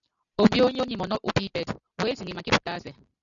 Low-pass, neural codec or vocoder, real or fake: 7.2 kHz; none; real